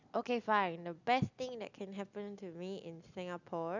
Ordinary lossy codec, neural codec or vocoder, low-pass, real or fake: none; none; 7.2 kHz; real